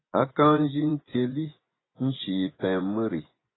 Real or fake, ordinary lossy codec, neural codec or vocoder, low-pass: fake; AAC, 16 kbps; vocoder, 24 kHz, 100 mel bands, Vocos; 7.2 kHz